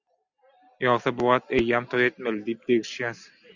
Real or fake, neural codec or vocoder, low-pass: real; none; 7.2 kHz